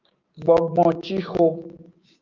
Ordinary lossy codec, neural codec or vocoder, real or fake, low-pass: Opus, 24 kbps; codec, 16 kHz, 6 kbps, DAC; fake; 7.2 kHz